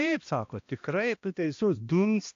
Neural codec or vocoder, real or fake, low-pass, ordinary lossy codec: codec, 16 kHz, 1 kbps, X-Codec, HuBERT features, trained on balanced general audio; fake; 7.2 kHz; AAC, 48 kbps